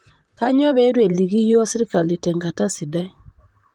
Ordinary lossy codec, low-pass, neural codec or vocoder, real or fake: Opus, 24 kbps; 19.8 kHz; vocoder, 44.1 kHz, 128 mel bands, Pupu-Vocoder; fake